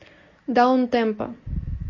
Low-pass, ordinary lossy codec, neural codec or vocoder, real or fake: 7.2 kHz; MP3, 32 kbps; none; real